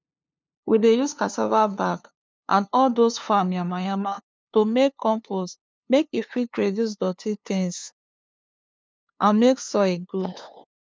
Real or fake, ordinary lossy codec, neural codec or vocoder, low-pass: fake; none; codec, 16 kHz, 2 kbps, FunCodec, trained on LibriTTS, 25 frames a second; none